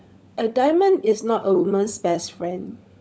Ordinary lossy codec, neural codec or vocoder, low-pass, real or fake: none; codec, 16 kHz, 16 kbps, FunCodec, trained on LibriTTS, 50 frames a second; none; fake